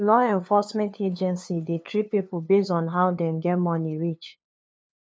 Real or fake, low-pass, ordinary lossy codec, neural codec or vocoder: fake; none; none; codec, 16 kHz, 2 kbps, FunCodec, trained on LibriTTS, 25 frames a second